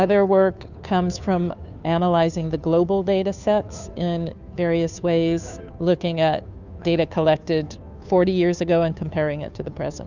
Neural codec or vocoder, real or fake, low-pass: codec, 16 kHz, 2 kbps, FunCodec, trained on Chinese and English, 25 frames a second; fake; 7.2 kHz